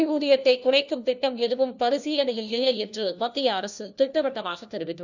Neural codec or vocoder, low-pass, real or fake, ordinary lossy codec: codec, 16 kHz, 1 kbps, FunCodec, trained on LibriTTS, 50 frames a second; 7.2 kHz; fake; none